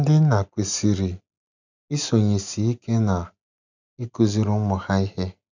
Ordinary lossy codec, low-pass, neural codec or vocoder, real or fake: none; 7.2 kHz; none; real